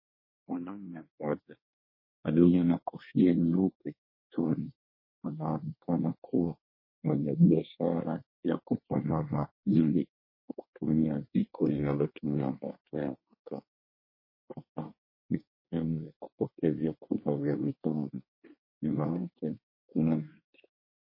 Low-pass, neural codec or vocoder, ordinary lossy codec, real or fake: 5.4 kHz; codec, 24 kHz, 1 kbps, SNAC; MP3, 24 kbps; fake